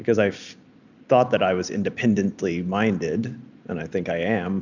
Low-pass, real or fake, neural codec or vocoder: 7.2 kHz; real; none